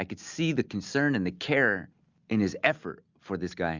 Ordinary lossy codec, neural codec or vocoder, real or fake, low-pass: Opus, 64 kbps; none; real; 7.2 kHz